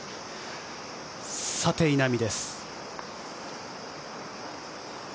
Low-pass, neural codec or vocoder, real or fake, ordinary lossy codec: none; none; real; none